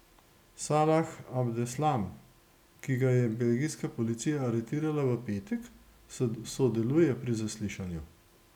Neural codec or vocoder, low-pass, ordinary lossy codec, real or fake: none; 19.8 kHz; none; real